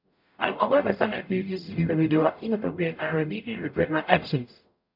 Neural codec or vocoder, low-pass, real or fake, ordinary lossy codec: codec, 44.1 kHz, 0.9 kbps, DAC; 5.4 kHz; fake; MP3, 48 kbps